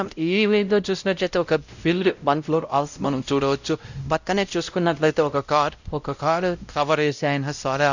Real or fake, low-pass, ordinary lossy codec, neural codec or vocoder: fake; 7.2 kHz; none; codec, 16 kHz, 0.5 kbps, X-Codec, WavLM features, trained on Multilingual LibriSpeech